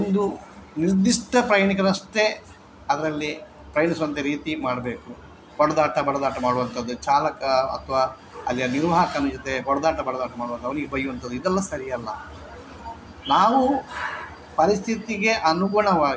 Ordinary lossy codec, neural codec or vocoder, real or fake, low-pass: none; none; real; none